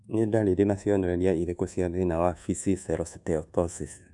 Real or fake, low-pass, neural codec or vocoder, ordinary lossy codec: fake; 10.8 kHz; codec, 24 kHz, 1.2 kbps, DualCodec; none